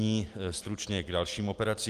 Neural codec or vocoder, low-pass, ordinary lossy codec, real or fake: none; 14.4 kHz; Opus, 32 kbps; real